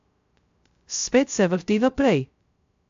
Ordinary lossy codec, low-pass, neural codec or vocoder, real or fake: AAC, 64 kbps; 7.2 kHz; codec, 16 kHz, 0.2 kbps, FocalCodec; fake